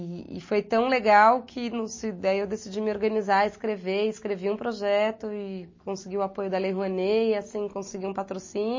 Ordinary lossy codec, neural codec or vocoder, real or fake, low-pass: MP3, 32 kbps; none; real; 7.2 kHz